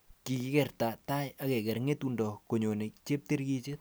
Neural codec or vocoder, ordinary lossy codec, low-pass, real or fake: none; none; none; real